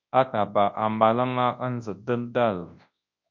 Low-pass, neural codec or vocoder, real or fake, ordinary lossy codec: 7.2 kHz; codec, 24 kHz, 0.9 kbps, WavTokenizer, large speech release; fake; MP3, 48 kbps